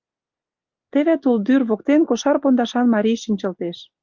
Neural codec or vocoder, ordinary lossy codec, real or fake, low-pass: none; Opus, 24 kbps; real; 7.2 kHz